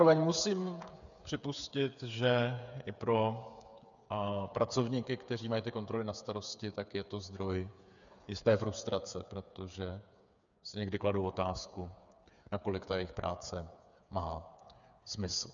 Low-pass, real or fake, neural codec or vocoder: 7.2 kHz; fake; codec, 16 kHz, 8 kbps, FreqCodec, smaller model